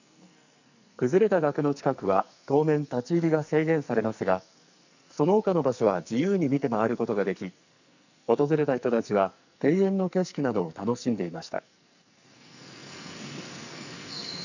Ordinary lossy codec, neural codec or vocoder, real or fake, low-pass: none; codec, 44.1 kHz, 2.6 kbps, SNAC; fake; 7.2 kHz